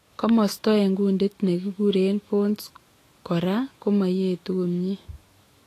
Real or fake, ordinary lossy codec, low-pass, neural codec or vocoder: fake; AAC, 48 kbps; 14.4 kHz; autoencoder, 48 kHz, 128 numbers a frame, DAC-VAE, trained on Japanese speech